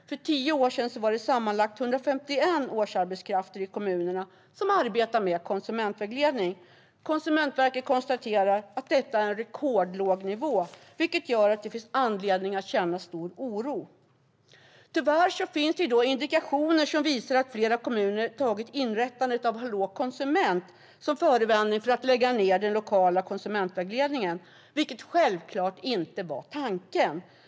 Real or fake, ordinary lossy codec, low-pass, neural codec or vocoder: real; none; none; none